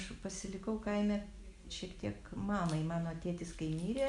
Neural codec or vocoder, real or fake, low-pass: none; real; 10.8 kHz